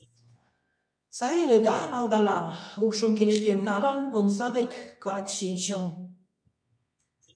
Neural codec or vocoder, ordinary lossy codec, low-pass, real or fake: codec, 24 kHz, 0.9 kbps, WavTokenizer, medium music audio release; MP3, 64 kbps; 9.9 kHz; fake